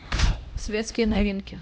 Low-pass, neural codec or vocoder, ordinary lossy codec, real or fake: none; codec, 16 kHz, 1 kbps, X-Codec, HuBERT features, trained on LibriSpeech; none; fake